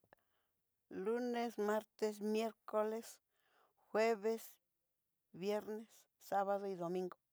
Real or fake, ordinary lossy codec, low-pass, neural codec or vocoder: real; none; none; none